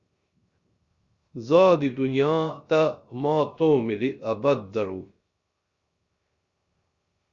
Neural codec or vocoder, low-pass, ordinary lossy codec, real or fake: codec, 16 kHz, 0.3 kbps, FocalCodec; 7.2 kHz; Opus, 64 kbps; fake